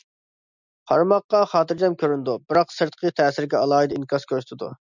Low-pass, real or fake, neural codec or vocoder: 7.2 kHz; real; none